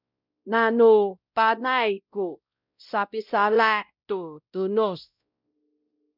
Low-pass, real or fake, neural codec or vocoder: 5.4 kHz; fake; codec, 16 kHz, 0.5 kbps, X-Codec, WavLM features, trained on Multilingual LibriSpeech